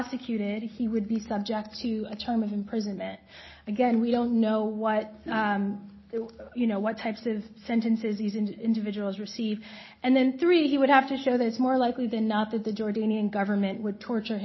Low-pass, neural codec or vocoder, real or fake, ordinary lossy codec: 7.2 kHz; none; real; MP3, 24 kbps